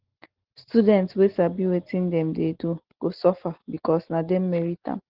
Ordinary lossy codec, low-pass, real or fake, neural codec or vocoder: Opus, 16 kbps; 5.4 kHz; real; none